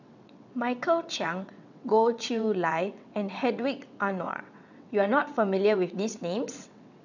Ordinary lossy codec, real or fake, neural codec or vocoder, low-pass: none; fake; vocoder, 22.05 kHz, 80 mel bands, WaveNeXt; 7.2 kHz